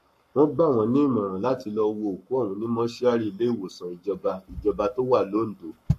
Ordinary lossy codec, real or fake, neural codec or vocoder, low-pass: MP3, 64 kbps; fake; codec, 44.1 kHz, 7.8 kbps, Pupu-Codec; 14.4 kHz